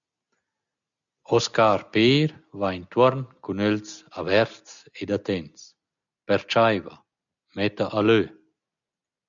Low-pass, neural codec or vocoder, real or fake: 7.2 kHz; none; real